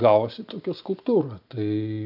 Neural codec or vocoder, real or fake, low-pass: none; real; 5.4 kHz